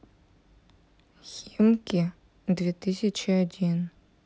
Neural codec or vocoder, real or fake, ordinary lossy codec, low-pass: none; real; none; none